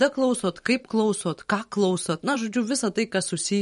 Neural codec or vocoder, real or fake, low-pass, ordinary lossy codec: vocoder, 44.1 kHz, 128 mel bands every 512 samples, BigVGAN v2; fake; 19.8 kHz; MP3, 48 kbps